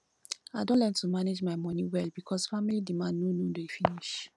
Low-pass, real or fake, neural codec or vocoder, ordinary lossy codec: none; real; none; none